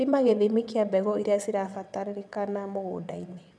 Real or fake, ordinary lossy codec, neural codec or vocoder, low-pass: fake; none; vocoder, 22.05 kHz, 80 mel bands, WaveNeXt; none